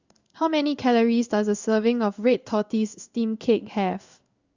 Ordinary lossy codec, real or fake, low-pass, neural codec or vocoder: Opus, 64 kbps; fake; 7.2 kHz; codec, 16 kHz in and 24 kHz out, 1 kbps, XY-Tokenizer